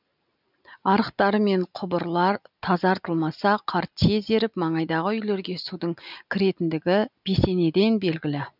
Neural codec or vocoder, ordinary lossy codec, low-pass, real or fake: none; none; 5.4 kHz; real